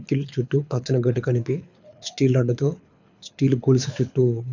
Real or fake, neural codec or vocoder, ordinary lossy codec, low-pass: fake; codec, 24 kHz, 6 kbps, HILCodec; none; 7.2 kHz